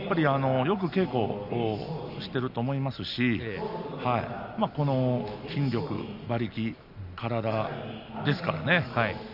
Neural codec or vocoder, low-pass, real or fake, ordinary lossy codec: none; 5.4 kHz; real; none